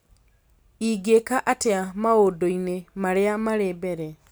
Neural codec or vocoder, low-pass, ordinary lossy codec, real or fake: none; none; none; real